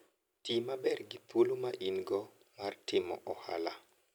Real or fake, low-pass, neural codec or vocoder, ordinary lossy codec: real; none; none; none